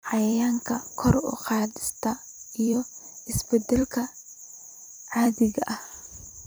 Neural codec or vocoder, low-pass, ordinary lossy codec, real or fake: vocoder, 44.1 kHz, 128 mel bands every 256 samples, BigVGAN v2; none; none; fake